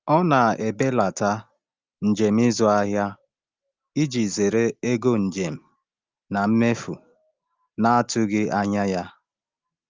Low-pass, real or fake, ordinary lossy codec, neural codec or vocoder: 7.2 kHz; real; Opus, 32 kbps; none